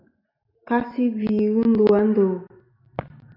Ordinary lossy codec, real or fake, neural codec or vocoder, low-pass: AAC, 48 kbps; real; none; 5.4 kHz